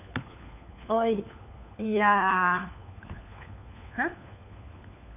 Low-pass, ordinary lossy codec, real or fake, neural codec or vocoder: 3.6 kHz; none; fake; codec, 16 kHz, 4 kbps, FunCodec, trained on LibriTTS, 50 frames a second